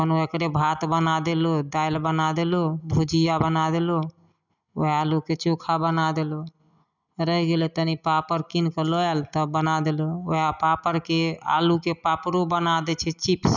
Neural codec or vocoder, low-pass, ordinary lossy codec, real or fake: none; 7.2 kHz; none; real